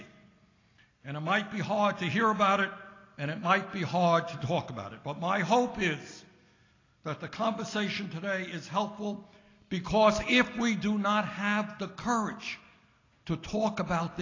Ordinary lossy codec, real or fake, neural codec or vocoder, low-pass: AAC, 32 kbps; real; none; 7.2 kHz